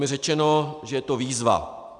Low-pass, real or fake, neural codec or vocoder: 10.8 kHz; real; none